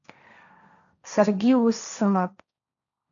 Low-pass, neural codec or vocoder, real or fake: 7.2 kHz; codec, 16 kHz, 1.1 kbps, Voila-Tokenizer; fake